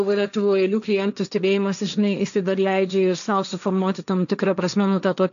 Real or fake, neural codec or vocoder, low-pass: fake; codec, 16 kHz, 1.1 kbps, Voila-Tokenizer; 7.2 kHz